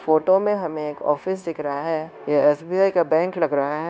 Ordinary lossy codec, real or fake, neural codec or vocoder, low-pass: none; fake; codec, 16 kHz, 0.9 kbps, LongCat-Audio-Codec; none